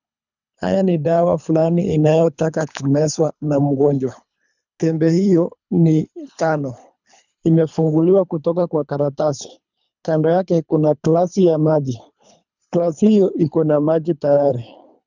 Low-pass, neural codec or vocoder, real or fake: 7.2 kHz; codec, 24 kHz, 3 kbps, HILCodec; fake